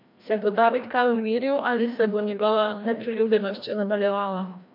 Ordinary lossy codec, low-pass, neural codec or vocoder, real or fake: MP3, 48 kbps; 5.4 kHz; codec, 16 kHz, 1 kbps, FreqCodec, larger model; fake